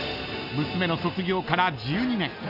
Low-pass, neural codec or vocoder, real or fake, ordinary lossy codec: 5.4 kHz; none; real; none